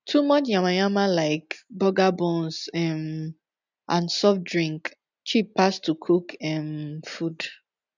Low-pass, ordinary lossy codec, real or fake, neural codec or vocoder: 7.2 kHz; none; real; none